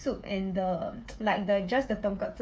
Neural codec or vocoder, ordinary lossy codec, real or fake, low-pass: codec, 16 kHz, 8 kbps, FreqCodec, smaller model; none; fake; none